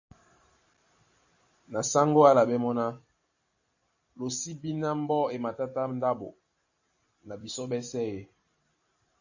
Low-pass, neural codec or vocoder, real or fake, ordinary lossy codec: 7.2 kHz; vocoder, 44.1 kHz, 128 mel bands every 256 samples, BigVGAN v2; fake; AAC, 48 kbps